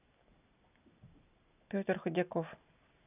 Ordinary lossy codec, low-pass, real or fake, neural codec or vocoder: none; 3.6 kHz; real; none